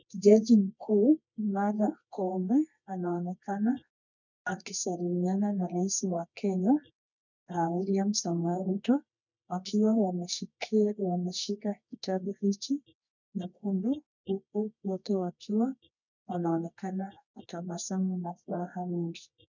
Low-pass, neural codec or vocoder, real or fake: 7.2 kHz; codec, 24 kHz, 0.9 kbps, WavTokenizer, medium music audio release; fake